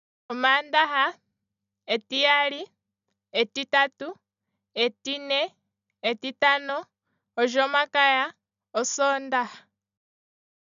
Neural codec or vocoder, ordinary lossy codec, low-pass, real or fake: none; none; 7.2 kHz; real